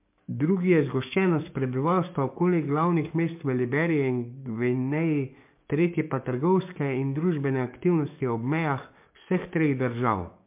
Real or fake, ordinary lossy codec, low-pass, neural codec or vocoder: fake; MP3, 32 kbps; 3.6 kHz; codec, 44.1 kHz, 7.8 kbps, DAC